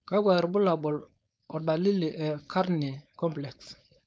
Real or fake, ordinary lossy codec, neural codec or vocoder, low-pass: fake; none; codec, 16 kHz, 4.8 kbps, FACodec; none